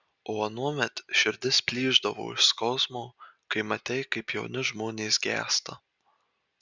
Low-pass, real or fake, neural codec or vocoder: 7.2 kHz; real; none